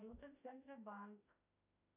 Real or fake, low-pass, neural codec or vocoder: fake; 3.6 kHz; autoencoder, 48 kHz, 32 numbers a frame, DAC-VAE, trained on Japanese speech